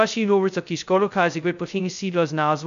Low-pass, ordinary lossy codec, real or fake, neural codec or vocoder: 7.2 kHz; AAC, 96 kbps; fake; codec, 16 kHz, 0.2 kbps, FocalCodec